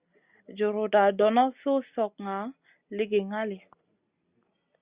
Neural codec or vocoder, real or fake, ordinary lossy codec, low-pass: none; real; Opus, 64 kbps; 3.6 kHz